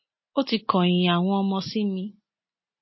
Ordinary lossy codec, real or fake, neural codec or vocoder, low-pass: MP3, 24 kbps; real; none; 7.2 kHz